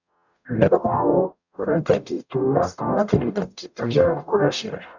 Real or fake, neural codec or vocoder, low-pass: fake; codec, 44.1 kHz, 0.9 kbps, DAC; 7.2 kHz